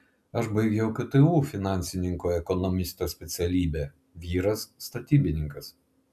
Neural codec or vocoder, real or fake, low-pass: none; real; 14.4 kHz